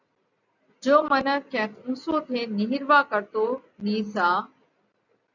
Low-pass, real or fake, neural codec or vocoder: 7.2 kHz; real; none